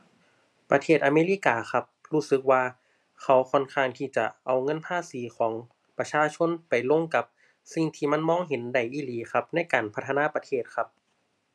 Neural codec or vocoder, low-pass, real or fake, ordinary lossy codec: none; none; real; none